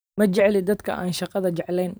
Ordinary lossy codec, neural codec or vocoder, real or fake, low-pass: none; vocoder, 44.1 kHz, 128 mel bands every 512 samples, BigVGAN v2; fake; none